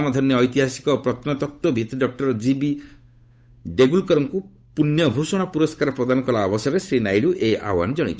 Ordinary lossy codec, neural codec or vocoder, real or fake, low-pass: none; codec, 16 kHz, 8 kbps, FunCodec, trained on Chinese and English, 25 frames a second; fake; none